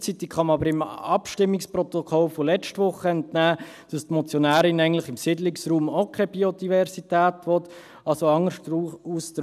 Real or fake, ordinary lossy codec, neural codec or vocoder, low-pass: real; none; none; 14.4 kHz